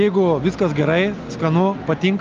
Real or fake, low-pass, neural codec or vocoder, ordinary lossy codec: real; 7.2 kHz; none; Opus, 32 kbps